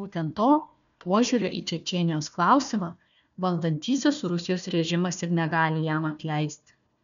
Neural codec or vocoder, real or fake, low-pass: codec, 16 kHz, 1 kbps, FunCodec, trained on Chinese and English, 50 frames a second; fake; 7.2 kHz